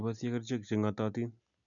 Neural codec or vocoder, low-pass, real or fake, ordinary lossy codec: none; 7.2 kHz; real; none